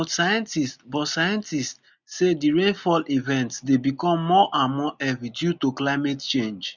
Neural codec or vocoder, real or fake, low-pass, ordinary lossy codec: none; real; 7.2 kHz; none